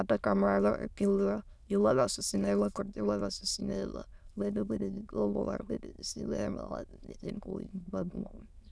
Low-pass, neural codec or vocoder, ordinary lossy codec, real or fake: none; autoencoder, 22.05 kHz, a latent of 192 numbers a frame, VITS, trained on many speakers; none; fake